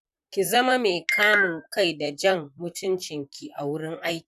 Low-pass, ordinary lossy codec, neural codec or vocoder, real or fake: 14.4 kHz; none; vocoder, 44.1 kHz, 128 mel bands, Pupu-Vocoder; fake